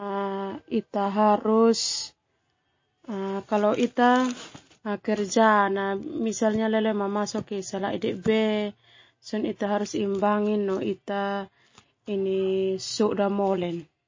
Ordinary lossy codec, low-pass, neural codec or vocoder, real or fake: MP3, 32 kbps; 7.2 kHz; none; real